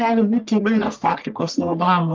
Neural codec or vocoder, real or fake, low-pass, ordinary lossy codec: codec, 44.1 kHz, 1.7 kbps, Pupu-Codec; fake; 7.2 kHz; Opus, 24 kbps